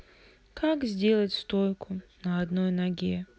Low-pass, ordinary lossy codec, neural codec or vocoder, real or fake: none; none; none; real